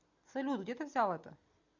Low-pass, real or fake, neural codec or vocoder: 7.2 kHz; real; none